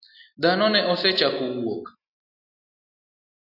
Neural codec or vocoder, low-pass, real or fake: none; 5.4 kHz; real